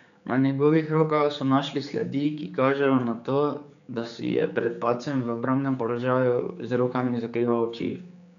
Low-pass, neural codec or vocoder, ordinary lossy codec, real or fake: 7.2 kHz; codec, 16 kHz, 4 kbps, X-Codec, HuBERT features, trained on general audio; none; fake